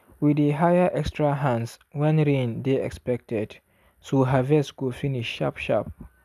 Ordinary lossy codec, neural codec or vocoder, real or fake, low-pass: none; none; real; 14.4 kHz